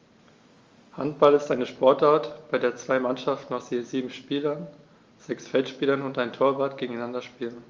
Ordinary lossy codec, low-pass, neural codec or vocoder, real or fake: Opus, 32 kbps; 7.2 kHz; none; real